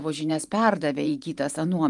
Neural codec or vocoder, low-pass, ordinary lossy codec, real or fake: vocoder, 44.1 kHz, 128 mel bands every 512 samples, BigVGAN v2; 10.8 kHz; Opus, 32 kbps; fake